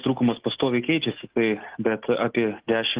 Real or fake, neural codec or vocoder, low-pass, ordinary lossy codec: real; none; 3.6 kHz; Opus, 16 kbps